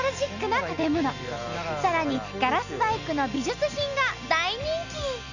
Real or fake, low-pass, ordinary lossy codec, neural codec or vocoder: real; 7.2 kHz; none; none